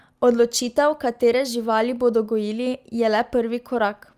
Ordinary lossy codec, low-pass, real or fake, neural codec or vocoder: Opus, 32 kbps; 14.4 kHz; real; none